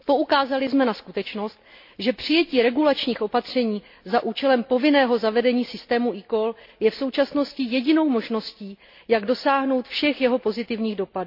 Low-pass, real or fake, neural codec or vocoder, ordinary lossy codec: 5.4 kHz; real; none; MP3, 32 kbps